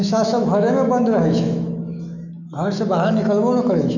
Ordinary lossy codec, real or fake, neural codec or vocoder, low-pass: none; fake; autoencoder, 48 kHz, 128 numbers a frame, DAC-VAE, trained on Japanese speech; 7.2 kHz